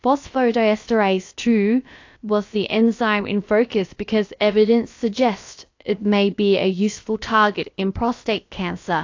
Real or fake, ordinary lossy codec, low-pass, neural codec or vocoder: fake; AAC, 48 kbps; 7.2 kHz; codec, 16 kHz, about 1 kbps, DyCAST, with the encoder's durations